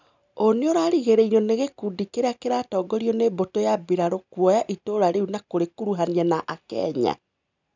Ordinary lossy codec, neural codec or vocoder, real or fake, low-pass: none; none; real; 7.2 kHz